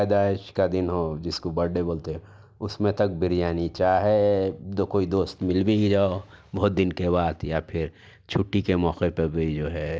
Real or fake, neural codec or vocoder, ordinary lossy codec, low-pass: real; none; none; none